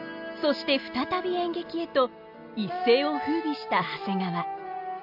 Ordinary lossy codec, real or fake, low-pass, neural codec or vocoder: MP3, 48 kbps; real; 5.4 kHz; none